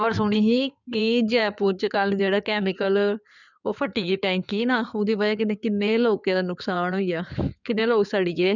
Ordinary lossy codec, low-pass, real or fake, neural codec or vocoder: none; 7.2 kHz; fake; codec, 16 kHz in and 24 kHz out, 2.2 kbps, FireRedTTS-2 codec